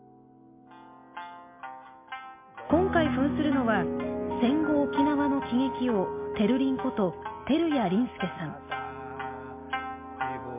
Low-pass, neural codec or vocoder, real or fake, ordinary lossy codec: 3.6 kHz; none; real; MP3, 24 kbps